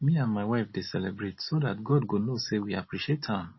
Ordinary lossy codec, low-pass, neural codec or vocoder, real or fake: MP3, 24 kbps; 7.2 kHz; none; real